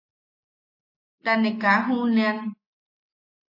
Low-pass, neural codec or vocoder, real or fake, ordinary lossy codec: 5.4 kHz; none; real; AAC, 32 kbps